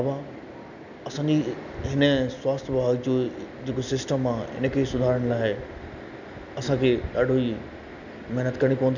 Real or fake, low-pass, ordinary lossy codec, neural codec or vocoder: real; 7.2 kHz; none; none